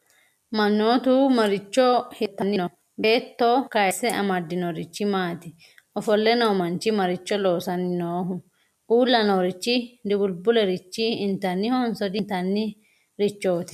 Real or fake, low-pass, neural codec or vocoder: real; 14.4 kHz; none